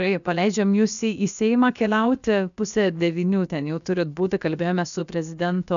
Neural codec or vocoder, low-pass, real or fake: codec, 16 kHz, about 1 kbps, DyCAST, with the encoder's durations; 7.2 kHz; fake